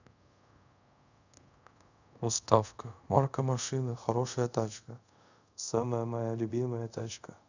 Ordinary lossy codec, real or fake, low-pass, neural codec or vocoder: none; fake; 7.2 kHz; codec, 24 kHz, 0.5 kbps, DualCodec